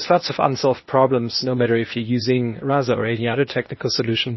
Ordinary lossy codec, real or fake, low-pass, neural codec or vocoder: MP3, 24 kbps; fake; 7.2 kHz; codec, 16 kHz, 0.8 kbps, ZipCodec